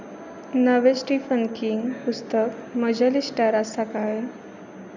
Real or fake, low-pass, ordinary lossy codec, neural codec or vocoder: real; 7.2 kHz; none; none